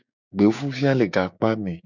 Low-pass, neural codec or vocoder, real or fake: 7.2 kHz; codec, 16 kHz, 6 kbps, DAC; fake